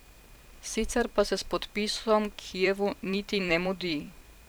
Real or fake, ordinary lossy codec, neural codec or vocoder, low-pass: fake; none; vocoder, 44.1 kHz, 128 mel bands every 512 samples, BigVGAN v2; none